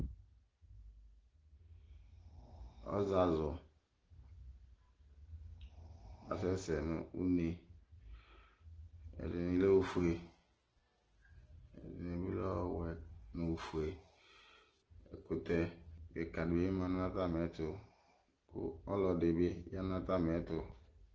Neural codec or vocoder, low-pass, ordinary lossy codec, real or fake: none; 7.2 kHz; Opus, 16 kbps; real